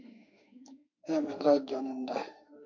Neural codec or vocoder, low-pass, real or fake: autoencoder, 48 kHz, 32 numbers a frame, DAC-VAE, trained on Japanese speech; 7.2 kHz; fake